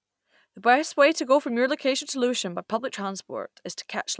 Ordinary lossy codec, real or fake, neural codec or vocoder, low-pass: none; real; none; none